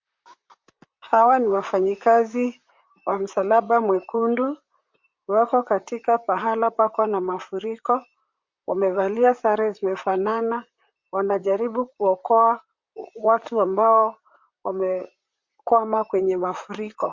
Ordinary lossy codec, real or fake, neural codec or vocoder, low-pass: MP3, 48 kbps; fake; vocoder, 44.1 kHz, 128 mel bands, Pupu-Vocoder; 7.2 kHz